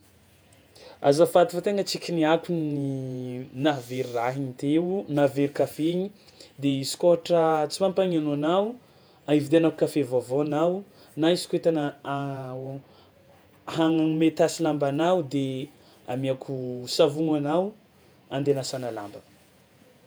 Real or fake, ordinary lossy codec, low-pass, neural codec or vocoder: fake; none; none; vocoder, 48 kHz, 128 mel bands, Vocos